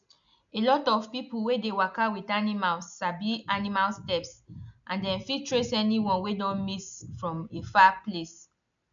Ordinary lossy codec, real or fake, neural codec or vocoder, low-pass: none; real; none; 7.2 kHz